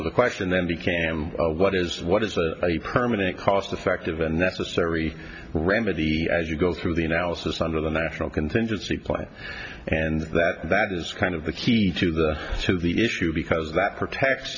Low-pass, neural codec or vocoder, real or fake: 7.2 kHz; none; real